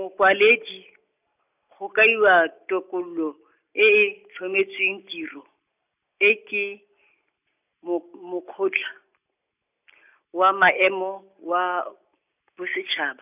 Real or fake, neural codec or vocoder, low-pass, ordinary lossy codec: real; none; 3.6 kHz; none